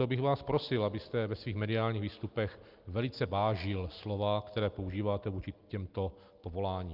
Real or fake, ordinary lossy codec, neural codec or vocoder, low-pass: real; Opus, 24 kbps; none; 5.4 kHz